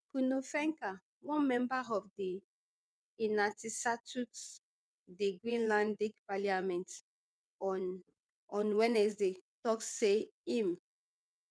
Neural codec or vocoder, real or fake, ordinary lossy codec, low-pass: vocoder, 22.05 kHz, 80 mel bands, WaveNeXt; fake; none; none